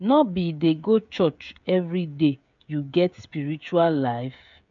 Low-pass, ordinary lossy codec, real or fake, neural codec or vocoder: 7.2 kHz; MP3, 64 kbps; fake; codec, 16 kHz, 16 kbps, FreqCodec, smaller model